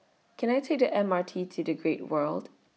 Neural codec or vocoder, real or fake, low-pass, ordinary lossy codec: none; real; none; none